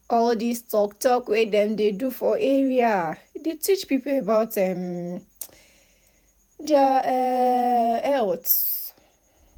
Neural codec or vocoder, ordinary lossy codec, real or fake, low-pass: vocoder, 48 kHz, 128 mel bands, Vocos; none; fake; none